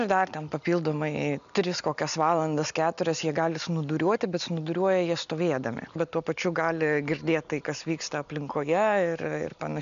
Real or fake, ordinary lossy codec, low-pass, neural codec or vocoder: real; MP3, 96 kbps; 7.2 kHz; none